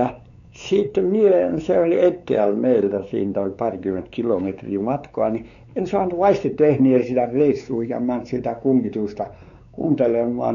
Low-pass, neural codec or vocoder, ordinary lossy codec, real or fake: 7.2 kHz; codec, 16 kHz, 4 kbps, X-Codec, WavLM features, trained on Multilingual LibriSpeech; none; fake